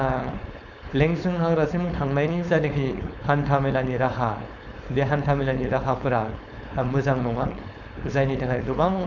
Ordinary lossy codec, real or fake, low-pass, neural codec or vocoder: none; fake; 7.2 kHz; codec, 16 kHz, 4.8 kbps, FACodec